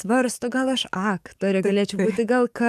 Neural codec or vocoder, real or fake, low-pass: codec, 44.1 kHz, 7.8 kbps, DAC; fake; 14.4 kHz